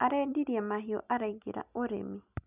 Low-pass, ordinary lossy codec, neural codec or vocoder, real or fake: 3.6 kHz; none; none; real